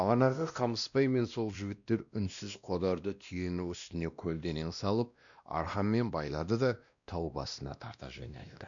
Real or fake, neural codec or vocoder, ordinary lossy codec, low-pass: fake; codec, 16 kHz, 1 kbps, X-Codec, WavLM features, trained on Multilingual LibriSpeech; none; 7.2 kHz